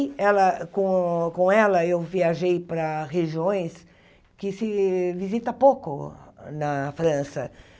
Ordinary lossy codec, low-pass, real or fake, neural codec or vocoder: none; none; real; none